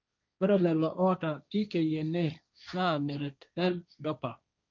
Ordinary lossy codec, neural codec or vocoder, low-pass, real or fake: none; codec, 16 kHz, 1.1 kbps, Voila-Tokenizer; 7.2 kHz; fake